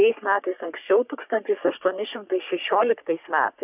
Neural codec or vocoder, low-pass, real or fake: codec, 44.1 kHz, 3.4 kbps, Pupu-Codec; 3.6 kHz; fake